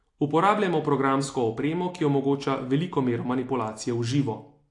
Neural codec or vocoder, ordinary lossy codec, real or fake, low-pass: none; AAC, 48 kbps; real; 10.8 kHz